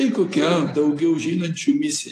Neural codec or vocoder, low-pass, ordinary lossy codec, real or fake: none; 14.4 kHz; AAC, 48 kbps; real